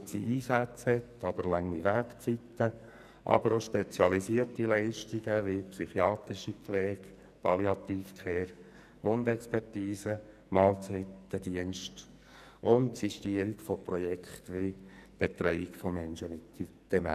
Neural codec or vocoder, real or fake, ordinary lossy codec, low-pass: codec, 44.1 kHz, 2.6 kbps, SNAC; fake; none; 14.4 kHz